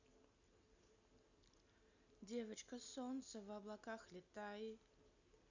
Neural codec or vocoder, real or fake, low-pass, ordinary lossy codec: none; real; 7.2 kHz; none